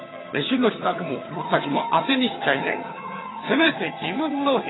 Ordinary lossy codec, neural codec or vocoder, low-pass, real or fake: AAC, 16 kbps; vocoder, 22.05 kHz, 80 mel bands, HiFi-GAN; 7.2 kHz; fake